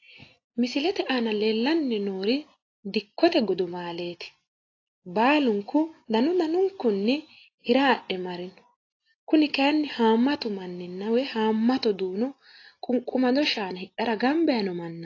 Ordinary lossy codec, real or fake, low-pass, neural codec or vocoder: AAC, 32 kbps; real; 7.2 kHz; none